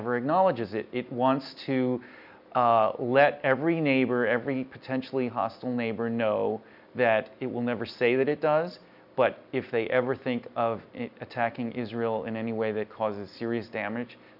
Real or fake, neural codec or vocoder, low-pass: real; none; 5.4 kHz